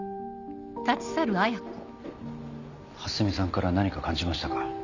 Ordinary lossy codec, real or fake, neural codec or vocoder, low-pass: none; real; none; 7.2 kHz